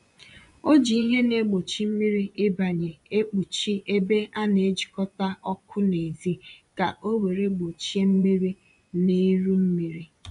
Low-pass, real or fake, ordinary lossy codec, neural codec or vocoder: 10.8 kHz; fake; none; vocoder, 24 kHz, 100 mel bands, Vocos